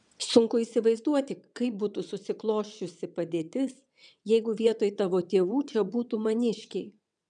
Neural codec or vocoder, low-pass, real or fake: vocoder, 22.05 kHz, 80 mel bands, WaveNeXt; 9.9 kHz; fake